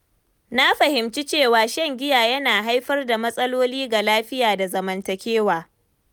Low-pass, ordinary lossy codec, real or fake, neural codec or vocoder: none; none; real; none